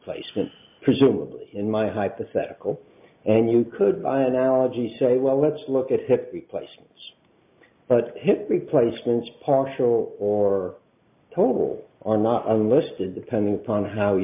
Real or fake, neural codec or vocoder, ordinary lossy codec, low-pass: real; none; MP3, 32 kbps; 3.6 kHz